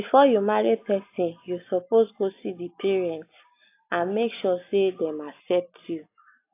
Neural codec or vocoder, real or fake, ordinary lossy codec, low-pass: none; real; AAC, 24 kbps; 3.6 kHz